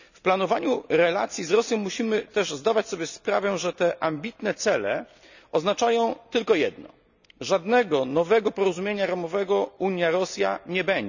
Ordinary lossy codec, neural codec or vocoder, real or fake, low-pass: none; none; real; 7.2 kHz